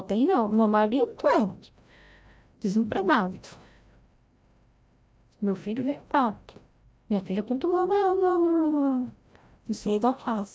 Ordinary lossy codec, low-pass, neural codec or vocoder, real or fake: none; none; codec, 16 kHz, 0.5 kbps, FreqCodec, larger model; fake